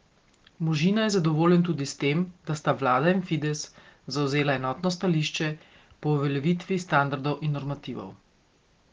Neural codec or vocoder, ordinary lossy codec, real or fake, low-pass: none; Opus, 16 kbps; real; 7.2 kHz